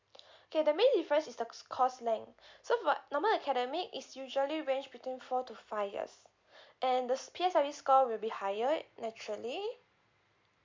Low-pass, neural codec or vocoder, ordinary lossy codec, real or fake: 7.2 kHz; none; MP3, 64 kbps; real